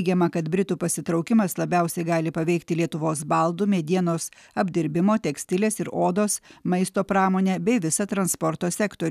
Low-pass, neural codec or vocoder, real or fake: 14.4 kHz; none; real